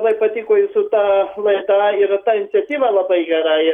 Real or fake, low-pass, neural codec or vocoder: real; 19.8 kHz; none